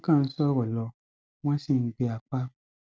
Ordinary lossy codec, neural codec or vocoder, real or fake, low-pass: none; none; real; none